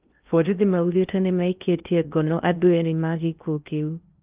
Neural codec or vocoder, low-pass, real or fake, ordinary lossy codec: codec, 16 kHz in and 24 kHz out, 0.6 kbps, FocalCodec, streaming, 2048 codes; 3.6 kHz; fake; Opus, 24 kbps